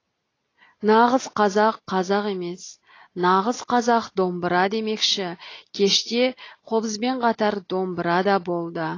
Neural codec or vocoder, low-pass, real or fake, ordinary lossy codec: none; 7.2 kHz; real; AAC, 32 kbps